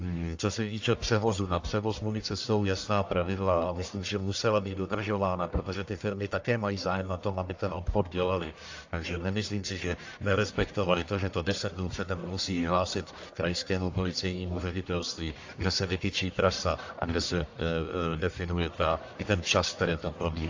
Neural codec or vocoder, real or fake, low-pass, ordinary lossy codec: codec, 44.1 kHz, 1.7 kbps, Pupu-Codec; fake; 7.2 kHz; AAC, 48 kbps